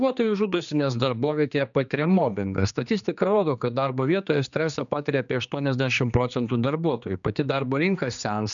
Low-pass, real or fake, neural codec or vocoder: 7.2 kHz; fake; codec, 16 kHz, 2 kbps, X-Codec, HuBERT features, trained on general audio